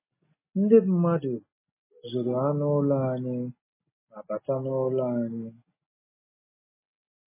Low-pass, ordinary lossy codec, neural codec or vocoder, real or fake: 3.6 kHz; MP3, 16 kbps; none; real